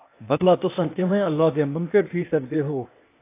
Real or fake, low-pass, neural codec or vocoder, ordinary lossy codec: fake; 3.6 kHz; codec, 16 kHz, 0.8 kbps, ZipCodec; AAC, 24 kbps